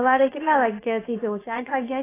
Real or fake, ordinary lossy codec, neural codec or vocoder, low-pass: fake; AAC, 16 kbps; codec, 16 kHz, about 1 kbps, DyCAST, with the encoder's durations; 3.6 kHz